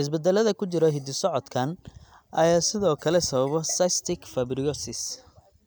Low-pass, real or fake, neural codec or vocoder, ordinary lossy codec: none; real; none; none